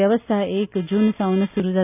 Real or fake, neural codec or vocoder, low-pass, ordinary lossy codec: real; none; 3.6 kHz; none